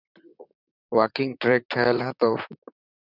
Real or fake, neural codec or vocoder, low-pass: fake; vocoder, 44.1 kHz, 128 mel bands, Pupu-Vocoder; 5.4 kHz